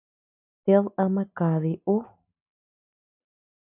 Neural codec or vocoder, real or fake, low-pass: none; real; 3.6 kHz